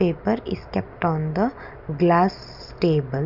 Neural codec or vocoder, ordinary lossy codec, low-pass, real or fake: none; none; 5.4 kHz; real